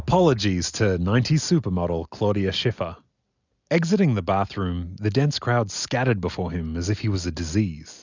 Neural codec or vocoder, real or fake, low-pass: none; real; 7.2 kHz